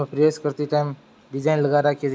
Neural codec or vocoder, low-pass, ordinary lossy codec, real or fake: none; none; none; real